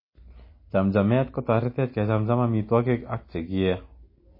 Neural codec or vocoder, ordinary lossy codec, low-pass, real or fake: none; MP3, 24 kbps; 5.4 kHz; real